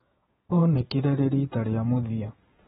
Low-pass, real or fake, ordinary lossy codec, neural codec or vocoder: 19.8 kHz; fake; AAC, 16 kbps; vocoder, 48 kHz, 128 mel bands, Vocos